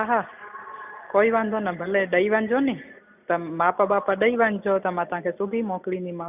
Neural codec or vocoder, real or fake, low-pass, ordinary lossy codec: none; real; 3.6 kHz; none